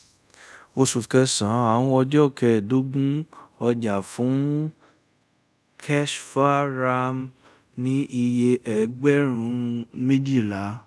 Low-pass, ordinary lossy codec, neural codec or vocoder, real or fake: none; none; codec, 24 kHz, 0.5 kbps, DualCodec; fake